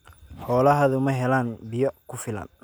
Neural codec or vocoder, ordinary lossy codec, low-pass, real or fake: none; none; none; real